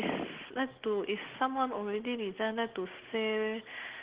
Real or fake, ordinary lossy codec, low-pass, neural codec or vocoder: real; Opus, 16 kbps; 3.6 kHz; none